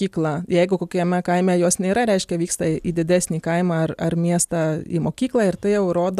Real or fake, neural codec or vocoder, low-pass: real; none; 14.4 kHz